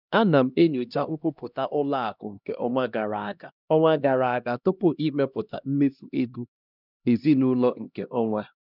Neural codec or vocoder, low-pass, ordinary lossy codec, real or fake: codec, 16 kHz, 1 kbps, X-Codec, HuBERT features, trained on LibriSpeech; 5.4 kHz; none; fake